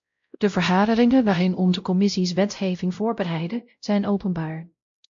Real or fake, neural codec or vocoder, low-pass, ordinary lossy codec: fake; codec, 16 kHz, 0.5 kbps, X-Codec, WavLM features, trained on Multilingual LibriSpeech; 7.2 kHz; MP3, 48 kbps